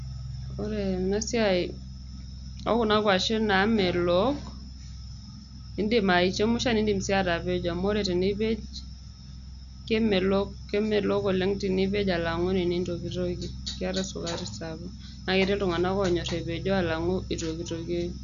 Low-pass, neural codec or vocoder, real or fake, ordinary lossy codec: 7.2 kHz; none; real; none